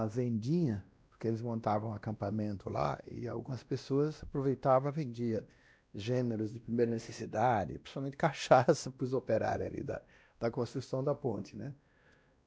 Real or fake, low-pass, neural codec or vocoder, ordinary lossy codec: fake; none; codec, 16 kHz, 1 kbps, X-Codec, WavLM features, trained on Multilingual LibriSpeech; none